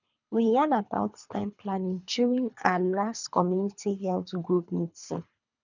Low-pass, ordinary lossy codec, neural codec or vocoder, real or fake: 7.2 kHz; none; codec, 24 kHz, 3 kbps, HILCodec; fake